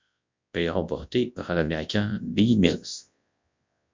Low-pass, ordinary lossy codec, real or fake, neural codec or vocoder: 7.2 kHz; MP3, 64 kbps; fake; codec, 24 kHz, 0.9 kbps, WavTokenizer, large speech release